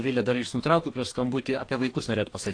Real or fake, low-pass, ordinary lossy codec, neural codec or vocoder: fake; 9.9 kHz; AAC, 48 kbps; codec, 44.1 kHz, 2.6 kbps, DAC